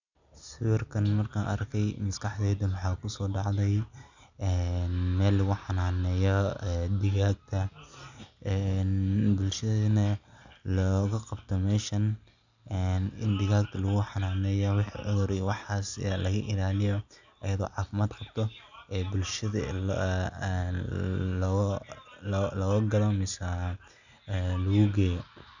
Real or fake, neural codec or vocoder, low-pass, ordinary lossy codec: real; none; 7.2 kHz; none